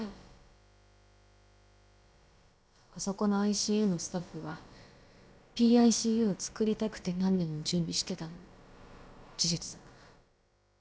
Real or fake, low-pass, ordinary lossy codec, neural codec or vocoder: fake; none; none; codec, 16 kHz, about 1 kbps, DyCAST, with the encoder's durations